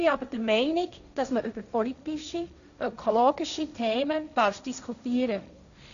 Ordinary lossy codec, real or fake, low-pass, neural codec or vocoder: none; fake; 7.2 kHz; codec, 16 kHz, 1.1 kbps, Voila-Tokenizer